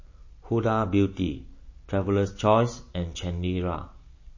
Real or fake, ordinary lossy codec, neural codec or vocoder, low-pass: real; MP3, 32 kbps; none; 7.2 kHz